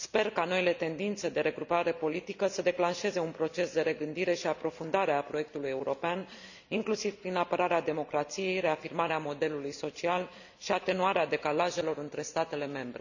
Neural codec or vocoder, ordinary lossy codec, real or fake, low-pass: none; none; real; 7.2 kHz